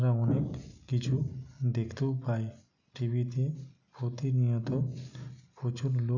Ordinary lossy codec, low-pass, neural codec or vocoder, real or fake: none; 7.2 kHz; none; real